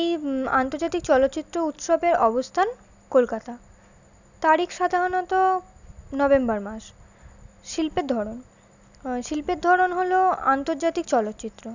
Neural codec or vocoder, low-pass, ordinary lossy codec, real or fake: none; 7.2 kHz; none; real